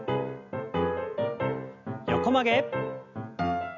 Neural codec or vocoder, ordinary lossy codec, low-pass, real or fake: none; none; 7.2 kHz; real